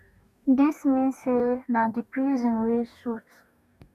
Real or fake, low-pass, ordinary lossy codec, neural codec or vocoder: fake; 14.4 kHz; none; codec, 44.1 kHz, 2.6 kbps, DAC